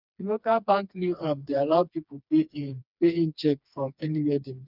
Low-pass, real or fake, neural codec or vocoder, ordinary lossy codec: 5.4 kHz; fake; codec, 16 kHz, 2 kbps, FreqCodec, smaller model; none